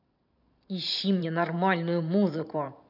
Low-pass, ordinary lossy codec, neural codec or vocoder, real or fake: 5.4 kHz; none; vocoder, 44.1 kHz, 128 mel bands every 512 samples, BigVGAN v2; fake